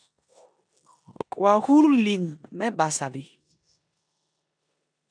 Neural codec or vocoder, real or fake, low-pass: codec, 16 kHz in and 24 kHz out, 0.9 kbps, LongCat-Audio-Codec, four codebook decoder; fake; 9.9 kHz